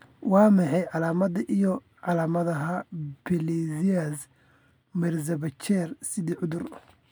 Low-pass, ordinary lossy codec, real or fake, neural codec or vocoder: none; none; real; none